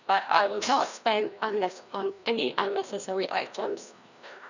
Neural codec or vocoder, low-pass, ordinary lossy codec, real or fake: codec, 16 kHz, 1 kbps, FreqCodec, larger model; 7.2 kHz; none; fake